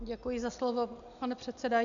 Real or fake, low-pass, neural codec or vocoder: real; 7.2 kHz; none